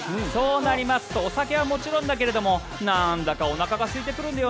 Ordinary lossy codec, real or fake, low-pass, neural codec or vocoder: none; real; none; none